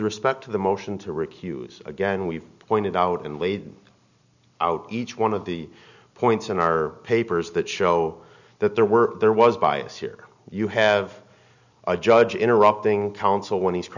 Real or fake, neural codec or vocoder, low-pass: real; none; 7.2 kHz